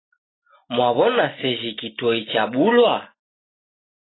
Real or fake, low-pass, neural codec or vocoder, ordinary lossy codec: real; 7.2 kHz; none; AAC, 16 kbps